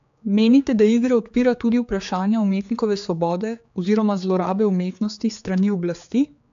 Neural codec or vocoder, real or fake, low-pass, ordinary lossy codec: codec, 16 kHz, 4 kbps, X-Codec, HuBERT features, trained on general audio; fake; 7.2 kHz; AAC, 64 kbps